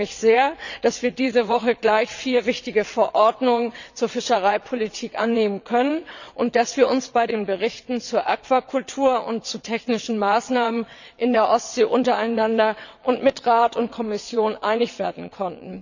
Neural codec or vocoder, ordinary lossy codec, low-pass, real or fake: vocoder, 22.05 kHz, 80 mel bands, WaveNeXt; none; 7.2 kHz; fake